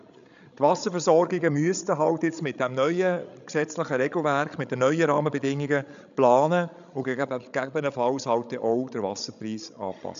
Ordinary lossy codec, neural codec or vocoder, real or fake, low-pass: AAC, 96 kbps; codec, 16 kHz, 16 kbps, FreqCodec, larger model; fake; 7.2 kHz